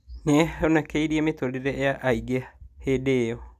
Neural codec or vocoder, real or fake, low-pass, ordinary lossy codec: none; real; 14.4 kHz; none